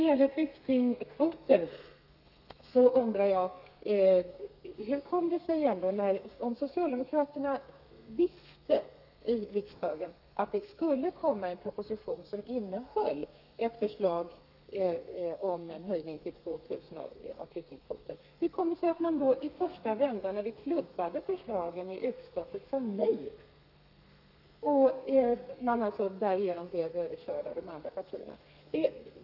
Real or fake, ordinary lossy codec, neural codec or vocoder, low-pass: fake; none; codec, 32 kHz, 1.9 kbps, SNAC; 5.4 kHz